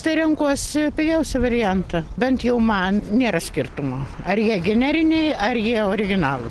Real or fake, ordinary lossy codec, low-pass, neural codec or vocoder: real; Opus, 16 kbps; 10.8 kHz; none